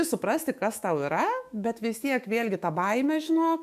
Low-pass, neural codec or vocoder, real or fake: 14.4 kHz; autoencoder, 48 kHz, 128 numbers a frame, DAC-VAE, trained on Japanese speech; fake